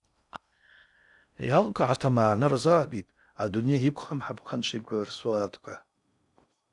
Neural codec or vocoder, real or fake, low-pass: codec, 16 kHz in and 24 kHz out, 0.6 kbps, FocalCodec, streaming, 2048 codes; fake; 10.8 kHz